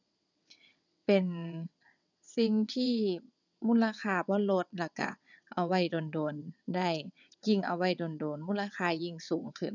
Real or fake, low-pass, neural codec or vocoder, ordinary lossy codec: fake; 7.2 kHz; vocoder, 22.05 kHz, 80 mel bands, WaveNeXt; none